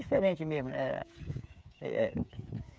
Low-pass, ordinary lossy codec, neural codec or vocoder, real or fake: none; none; codec, 16 kHz, 8 kbps, FreqCodec, smaller model; fake